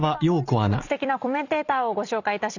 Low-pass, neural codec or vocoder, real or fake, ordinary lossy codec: 7.2 kHz; none; real; none